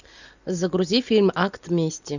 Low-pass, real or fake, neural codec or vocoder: 7.2 kHz; real; none